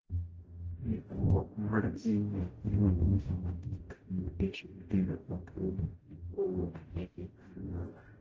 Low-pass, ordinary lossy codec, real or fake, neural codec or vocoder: 7.2 kHz; Opus, 16 kbps; fake; codec, 44.1 kHz, 0.9 kbps, DAC